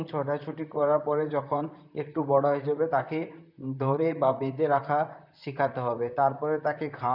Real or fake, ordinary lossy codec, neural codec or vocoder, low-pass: fake; none; vocoder, 44.1 kHz, 128 mel bands, Pupu-Vocoder; 5.4 kHz